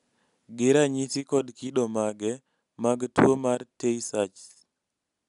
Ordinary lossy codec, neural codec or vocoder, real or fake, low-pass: none; none; real; 10.8 kHz